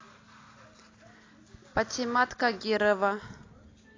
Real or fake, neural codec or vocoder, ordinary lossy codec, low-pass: real; none; AAC, 32 kbps; 7.2 kHz